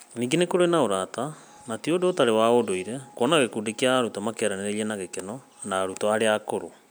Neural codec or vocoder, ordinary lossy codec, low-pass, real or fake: none; none; none; real